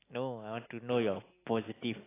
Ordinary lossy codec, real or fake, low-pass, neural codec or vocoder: AAC, 16 kbps; real; 3.6 kHz; none